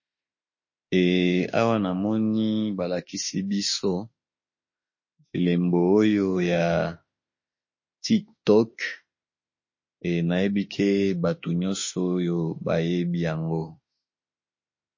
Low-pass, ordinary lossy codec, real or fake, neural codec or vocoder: 7.2 kHz; MP3, 32 kbps; fake; autoencoder, 48 kHz, 32 numbers a frame, DAC-VAE, trained on Japanese speech